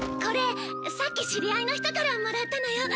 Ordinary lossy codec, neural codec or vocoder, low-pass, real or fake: none; none; none; real